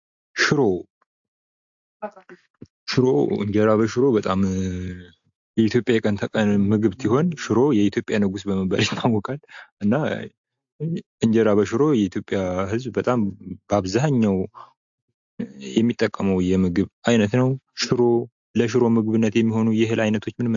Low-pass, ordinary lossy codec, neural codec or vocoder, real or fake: 7.2 kHz; AAC, 64 kbps; none; real